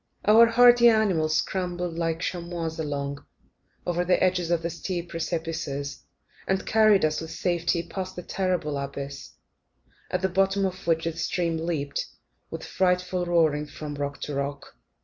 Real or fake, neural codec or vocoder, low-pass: real; none; 7.2 kHz